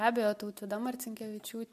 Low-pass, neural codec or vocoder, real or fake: 14.4 kHz; none; real